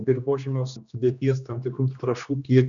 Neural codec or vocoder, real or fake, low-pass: codec, 16 kHz, 2 kbps, X-Codec, HuBERT features, trained on general audio; fake; 7.2 kHz